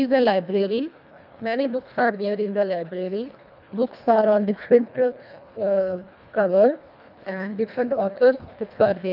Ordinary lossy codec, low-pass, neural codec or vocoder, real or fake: none; 5.4 kHz; codec, 24 kHz, 1.5 kbps, HILCodec; fake